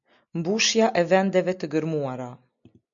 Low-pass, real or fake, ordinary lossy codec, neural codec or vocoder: 7.2 kHz; real; MP3, 96 kbps; none